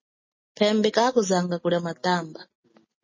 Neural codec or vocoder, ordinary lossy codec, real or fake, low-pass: none; MP3, 32 kbps; real; 7.2 kHz